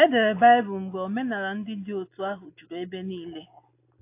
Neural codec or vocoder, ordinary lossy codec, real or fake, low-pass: none; AAC, 24 kbps; real; 3.6 kHz